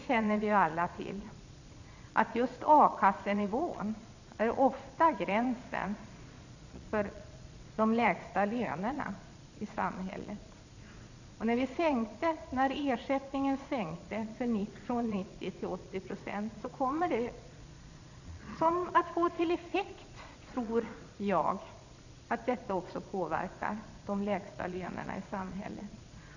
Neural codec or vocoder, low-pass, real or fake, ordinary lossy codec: vocoder, 22.05 kHz, 80 mel bands, Vocos; 7.2 kHz; fake; none